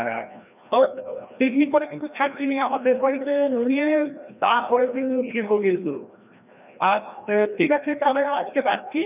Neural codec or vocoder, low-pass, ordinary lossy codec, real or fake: codec, 16 kHz, 1 kbps, FreqCodec, larger model; 3.6 kHz; none; fake